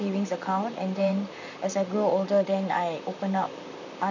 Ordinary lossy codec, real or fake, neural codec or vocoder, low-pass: none; fake; vocoder, 44.1 kHz, 128 mel bands, Pupu-Vocoder; 7.2 kHz